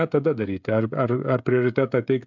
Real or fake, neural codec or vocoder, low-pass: fake; codec, 16 kHz, 16 kbps, FreqCodec, smaller model; 7.2 kHz